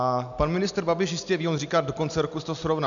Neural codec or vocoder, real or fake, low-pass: none; real; 7.2 kHz